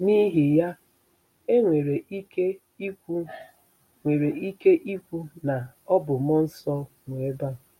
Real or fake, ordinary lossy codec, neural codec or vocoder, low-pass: real; MP3, 64 kbps; none; 19.8 kHz